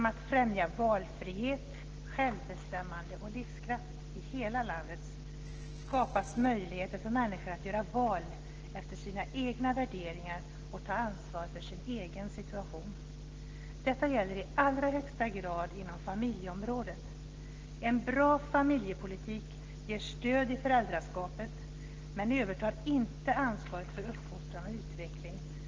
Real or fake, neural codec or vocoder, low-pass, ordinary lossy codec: real; none; 7.2 kHz; Opus, 16 kbps